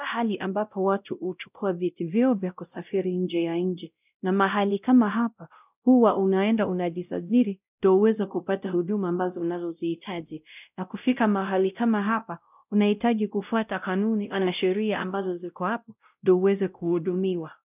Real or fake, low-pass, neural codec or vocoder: fake; 3.6 kHz; codec, 16 kHz, 0.5 kbps, X-Codec, WavLM features, trained on Multilingual LibriSpeech